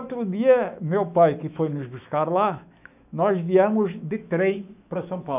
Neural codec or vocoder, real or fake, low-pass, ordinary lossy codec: autoencoder, 48 kHz, 128 numbers a frame, DAC-VAE, trained on Japanese speech; fake; 3.6 kHz; none